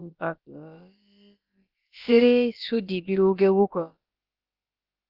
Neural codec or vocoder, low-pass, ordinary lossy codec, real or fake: codec, 16 kHz, about 1 kbps, DyCAST, with the encoder's durations; 5.4 kHz; Opus, 32 kbps; fake